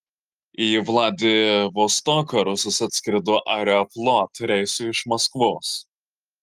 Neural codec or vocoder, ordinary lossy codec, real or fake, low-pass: none; Opus, 24 kbps; real; 14.4 kHz